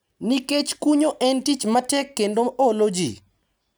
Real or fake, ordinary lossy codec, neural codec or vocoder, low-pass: real; none; none; none